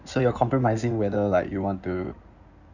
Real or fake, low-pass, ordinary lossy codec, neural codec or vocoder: fake; 7.2 kHz; none; codec, 16 kHz in and 24 kHz out, 2.2 kbps, FireRedTTS-2 codec